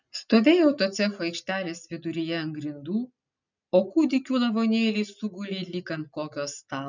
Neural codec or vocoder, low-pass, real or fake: none; 7.2 kHz; real